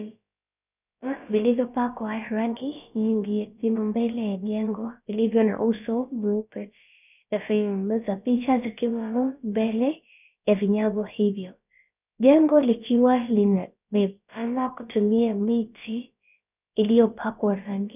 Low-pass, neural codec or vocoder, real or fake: 3.6 kHz; codec, 16 kHz, about 1 kbps, DyCAST, with the encoder's durations; fake